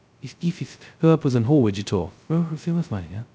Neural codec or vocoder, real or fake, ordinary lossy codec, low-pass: codec, 16 kHz, 0.2 kbps, FocalCodec; fake; none; none